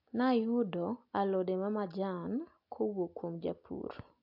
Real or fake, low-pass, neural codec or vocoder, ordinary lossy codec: real; 5.4 kHz; none; AAC, 48 kbps